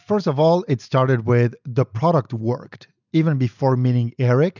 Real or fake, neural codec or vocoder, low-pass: real; none; 7.2 kHz